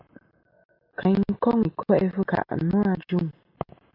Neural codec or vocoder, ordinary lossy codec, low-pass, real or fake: none; MP3, 48 kbps; 5.4 kHz; real